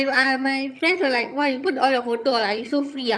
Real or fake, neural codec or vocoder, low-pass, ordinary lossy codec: fake; vocoder, 22.05 kHz, 80 mel bands, HiFi-GAN; none; none